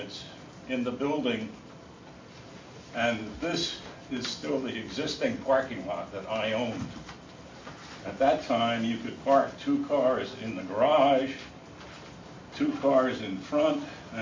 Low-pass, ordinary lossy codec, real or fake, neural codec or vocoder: 7.2 kHz; MP3, 48 kbps; real; none